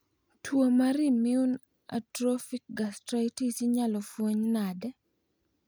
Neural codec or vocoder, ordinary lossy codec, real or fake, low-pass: none; none; real; none